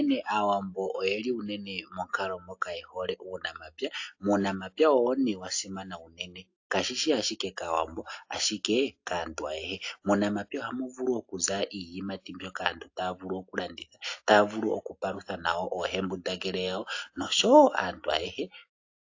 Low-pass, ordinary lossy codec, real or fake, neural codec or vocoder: 7.2 kHz; AAC, 48 kbps; real; none